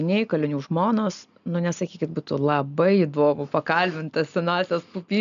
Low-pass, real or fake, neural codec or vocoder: 7.2 kHz; real; none